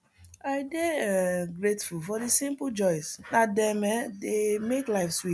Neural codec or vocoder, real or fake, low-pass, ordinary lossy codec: none; real; none; none